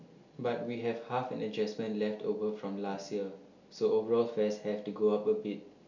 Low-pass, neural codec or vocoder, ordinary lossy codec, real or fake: 7.2 kHz; none; none; real